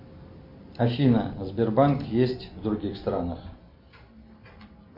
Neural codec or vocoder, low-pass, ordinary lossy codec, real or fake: none; 5.4 kHz; MP3, 32 kbps; real